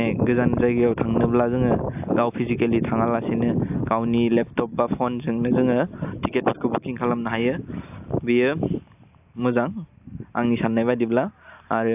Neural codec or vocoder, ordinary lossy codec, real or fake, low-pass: none; AAC, 32 kbps; real; 3.6 kHz